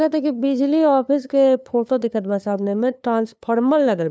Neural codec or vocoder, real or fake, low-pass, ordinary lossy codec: codec, 16 kHz, 2 kbps, FunCodec, trained on LibriTTS, 25 frames a second; fake; none; none